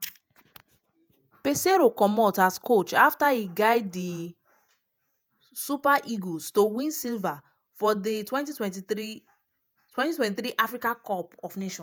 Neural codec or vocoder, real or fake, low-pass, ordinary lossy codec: vocoder, 48 kHz, 128 mel bands, Vocos; fake; none; none